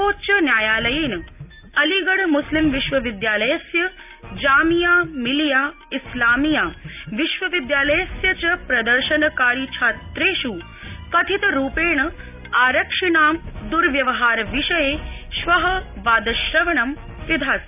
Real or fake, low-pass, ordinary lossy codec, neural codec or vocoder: real; 3.6 kHz; none; none